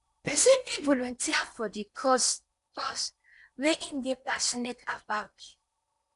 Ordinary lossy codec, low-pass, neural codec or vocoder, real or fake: none; 10.8 kHz; codec, 16 kHz in and 24 kHz out, 0.8 kbps, FocalCodec, streaming, 65536 codes; fake